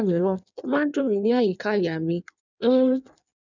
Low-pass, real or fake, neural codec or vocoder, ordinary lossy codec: 7.2 kHz; fake; codec, 16 kHz in and 24 kHz out, 1.1 kbps, FireRedTTS-2 codec; none